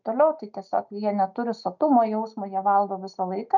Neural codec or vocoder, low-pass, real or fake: none; 7.2 kHz; real